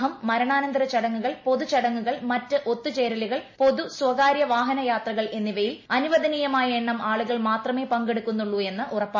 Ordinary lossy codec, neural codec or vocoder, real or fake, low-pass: MP3, 32 kbps; none; real; 7.2 kHz